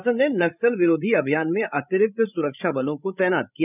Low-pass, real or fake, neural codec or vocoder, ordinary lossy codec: 3.6 kHz; fake; codec, 16 kHz, 16 kbps, FreqCodec, larger model; none